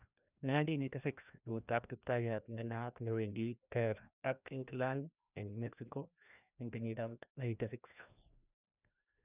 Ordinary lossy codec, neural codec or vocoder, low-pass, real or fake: none; codec, 16 kHz, 1 kbps, FreqCodec, larger model; 3.6 kHz; fake